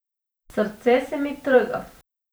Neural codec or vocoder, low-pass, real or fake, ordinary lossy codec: vocoder, 44.1 kHz, 128 mel bands every 512 samples, BigVGAN v2; none; fake; none